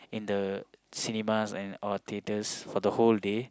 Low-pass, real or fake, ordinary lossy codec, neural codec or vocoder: none; real; none; none